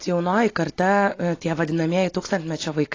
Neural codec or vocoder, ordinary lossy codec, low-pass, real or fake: none; AAC, 32 kbps; 7.2 kHz; real